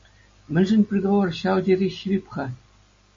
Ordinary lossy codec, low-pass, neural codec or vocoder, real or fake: MP3, 32 kbps; 7.2 kHz; none; real